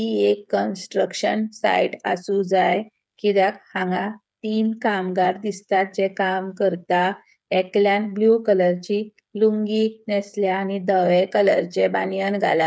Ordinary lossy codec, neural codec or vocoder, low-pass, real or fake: none; codec, 16 kHz, 8 kbps, FreqCodec, smaller model; none; fake